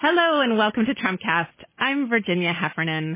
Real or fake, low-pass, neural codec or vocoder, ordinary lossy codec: real; 3.6 kHz; none; MP3, 16 kbps